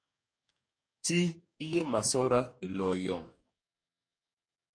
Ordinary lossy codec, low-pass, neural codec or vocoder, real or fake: MP3, 64 kbps; 9.9 kHz; codec, 44.1 kHz, 2.6 kbps, DAC; fake